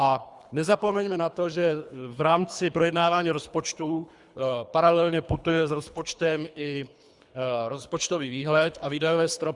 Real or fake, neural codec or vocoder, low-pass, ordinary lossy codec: fake; codec, 24 kHz, 3 kbps, HILCodec; 10.8 kHz; Opus, 64 kbps